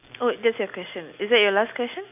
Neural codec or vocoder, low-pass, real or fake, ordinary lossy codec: none; 3.6 kHz; real; none